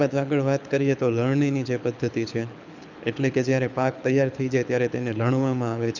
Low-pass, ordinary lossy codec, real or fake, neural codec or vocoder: 7.2 kHz; none; fake; codec, 24 kHz, 6 kbps, HILCodec